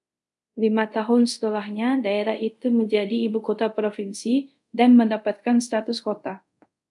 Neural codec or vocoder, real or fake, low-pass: codec, 24 kHz, 0.5 kbps, DualCodec; fake; 10.8 kHz